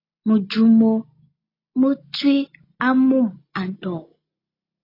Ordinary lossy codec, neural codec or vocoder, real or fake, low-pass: AAC, 32 kbps; none; real; 5.4 kHz